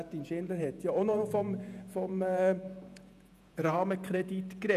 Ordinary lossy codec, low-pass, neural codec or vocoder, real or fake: none; 14.4 kHz; vocoder, 48 kHz, 128 mel bands, Vocos; fake